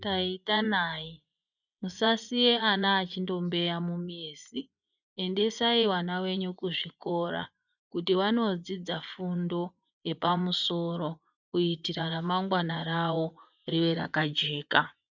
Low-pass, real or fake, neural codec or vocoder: 7.2 kHz; fake; vocoder, 24 kHz, 100 mel bands, Vocos